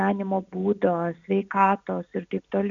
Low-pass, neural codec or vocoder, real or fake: 7.2 kHz; none; real